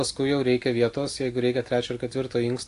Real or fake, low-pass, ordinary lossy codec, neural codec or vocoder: real; 10.8 kHz; AAC, 48 kbps; none